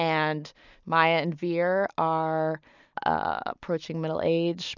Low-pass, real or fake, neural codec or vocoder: 7.2 kHz; real; none